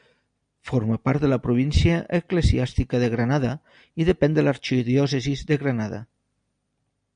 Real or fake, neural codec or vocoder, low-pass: real; none; 9.9 kHz